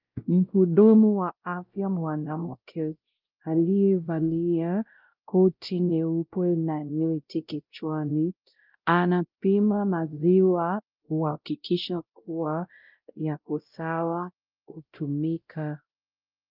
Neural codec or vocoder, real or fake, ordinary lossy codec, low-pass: codec, 16 kHz, 0.5 kbps, X-Codec, WavLM features, trained on Multilingual LibriSpeech; fake; Opus, 24 kbps; 5.4 kHz